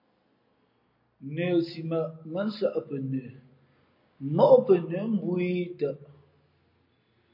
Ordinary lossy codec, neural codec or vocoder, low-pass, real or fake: MP3, 32 kbps; none; 5.4 kHz; real